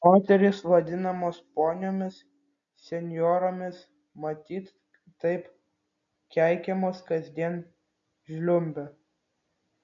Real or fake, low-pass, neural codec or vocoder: real; 7.2 kHz; none